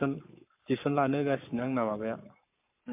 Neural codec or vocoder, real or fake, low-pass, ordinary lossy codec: codec, 44.1 kHz, 7.8 kbps, Pupu-Codec; fake; 3.6 kHz; MP3, 32 kbps